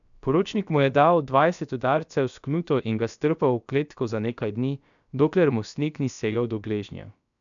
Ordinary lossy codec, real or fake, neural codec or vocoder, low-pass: none; fake; codec, 16 kHz, about 1 kbps, DyCAST, with the encoder's durations; 7.2 kHz